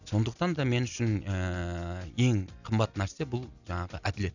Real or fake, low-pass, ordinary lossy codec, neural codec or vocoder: real; 7.2 kHz; none; none